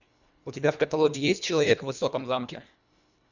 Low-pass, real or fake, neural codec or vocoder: 7.2 kHz; fake; codec, 24 kHz, 1.5 kbps, HILCodec